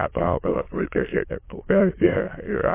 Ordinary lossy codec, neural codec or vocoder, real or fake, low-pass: MP3, 24 kbps; autoencoder, 22.05 kHz, a latent of 192 numbers a frame, VITS, trained on many speakers; fake; 3.6 kHz